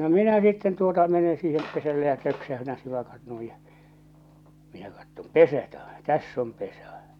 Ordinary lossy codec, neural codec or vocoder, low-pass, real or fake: none; none; 19.8 kHz; real